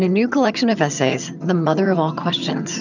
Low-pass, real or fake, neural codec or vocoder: 7.2 kHz; fake; vocoder, 22.05 kHz, 80 mel bands, HiFi-GAN